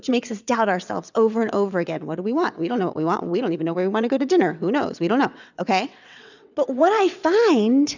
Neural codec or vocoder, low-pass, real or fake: vocoder, 22.05 kHz, 80 mel bands, WaveNeXt; 7.2 kHz; fake